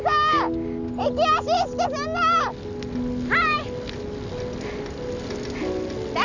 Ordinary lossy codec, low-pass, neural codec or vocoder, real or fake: none; 7.2 kHz; none; real